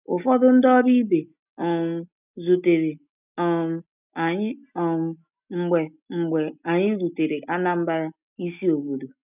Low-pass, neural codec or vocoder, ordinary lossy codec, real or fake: 3.6 kHz; none; none; real